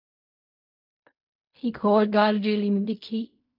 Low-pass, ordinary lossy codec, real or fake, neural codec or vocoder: 5.4 kHz; MP3, 48 kbps; fake; codec, 16 kHz in and 24 kHz out, 0.4 kbps, LongCat-Audio-Codec, fine tuned four codebook decoder